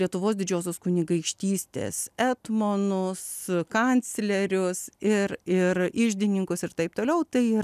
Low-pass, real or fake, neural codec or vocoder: 14.4 kHz; real; none